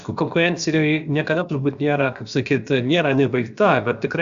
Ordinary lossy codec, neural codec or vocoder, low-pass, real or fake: Opus, 64 kbps; codec, 16 kHz, about 1 kbps, DyCAST, with the encoder's durations; 7.2 kHz; fake